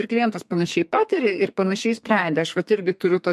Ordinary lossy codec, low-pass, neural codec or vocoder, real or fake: MP3, 64 kbps; 14.4 kHz; codec, 32 kHz, 1.9 kbps, SNAC; fake